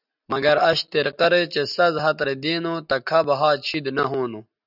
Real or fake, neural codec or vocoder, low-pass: real; none; 5.4 kHz